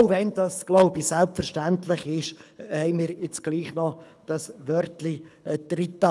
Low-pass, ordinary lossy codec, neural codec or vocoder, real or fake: none; none; codec, 24 kHz, 6 kbps, HILCodec; fake